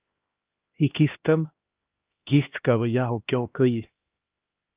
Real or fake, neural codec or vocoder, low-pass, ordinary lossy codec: fake; codec, 16 kHz, 1 kbps, X-Codec, HuBERT features, trained on LibriSpeech; 3.6 kHz; Opus, 24 kbps